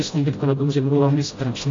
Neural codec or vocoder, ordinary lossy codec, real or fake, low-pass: codec, 16 kHz, 0.5 kbps, FreqCodec, smaller model; AAC, 32 kbps; fake; 7.2 kHz